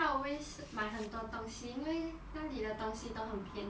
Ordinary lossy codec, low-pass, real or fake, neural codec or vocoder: none; none; real; none